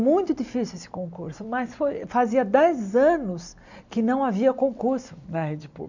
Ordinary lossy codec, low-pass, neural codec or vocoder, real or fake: none; 7.2 kHz; none; real